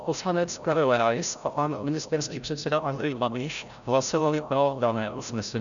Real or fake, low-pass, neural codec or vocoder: fake; 7.2 kHz; codec, 16 kHz, 0.5 kbps, FreqCodec, larger model